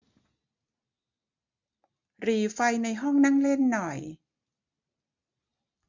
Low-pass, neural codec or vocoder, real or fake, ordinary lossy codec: 7.2 kHz; none; real; MP3, 48 kbps